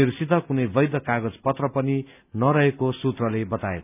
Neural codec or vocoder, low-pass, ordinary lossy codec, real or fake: none; 3.6 kHz; none; real